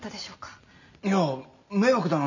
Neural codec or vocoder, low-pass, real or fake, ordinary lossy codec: none; 7.2 kHz; real; none